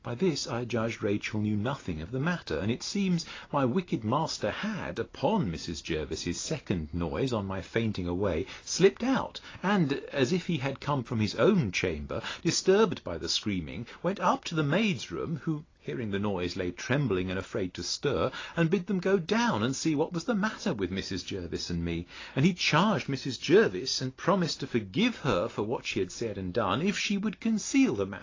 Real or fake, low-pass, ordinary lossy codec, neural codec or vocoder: real; 7.2 kHz; AAC, 32 kbps; none